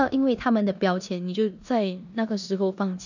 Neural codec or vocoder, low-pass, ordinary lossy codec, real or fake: codec, 16 kHz in and 24 kHz out, 0.9 kbps, LongCat-Audio-Codec, four codebook decoder; 7.2 kHz; none; fake